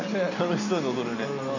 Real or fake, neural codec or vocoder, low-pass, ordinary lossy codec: real; none; 7.2 kHz; none